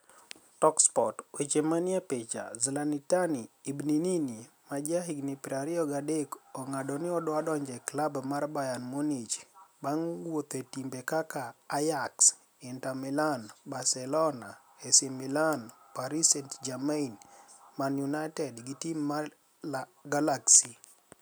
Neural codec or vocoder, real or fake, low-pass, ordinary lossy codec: none; real; none; none